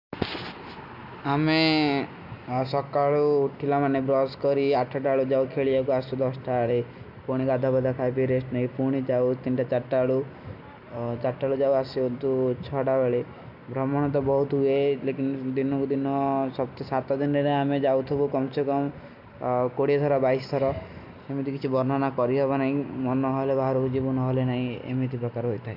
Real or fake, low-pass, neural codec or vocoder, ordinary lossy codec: real; 5.4 kHz; none; MP3, 48 kbps